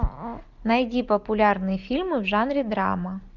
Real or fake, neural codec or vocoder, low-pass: real; none; 7.2 kHz